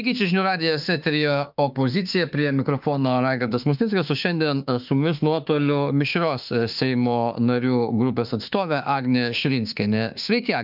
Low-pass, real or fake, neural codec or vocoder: 5.4 kHz; fake; autoencoder, 48 kHz, 32 numbers a frame, DAC-VAE, trained on Japanese speech